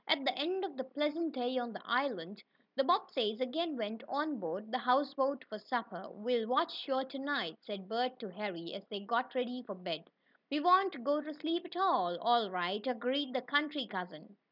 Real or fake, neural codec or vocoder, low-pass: real; none; 5.4 kHz